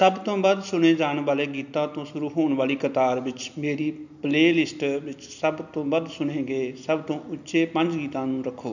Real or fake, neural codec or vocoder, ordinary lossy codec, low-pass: real; none; none; 7.2 kHz